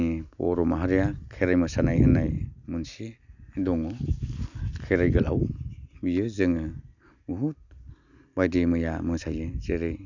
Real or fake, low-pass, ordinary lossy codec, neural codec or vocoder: real; 7.2 kHz; none; none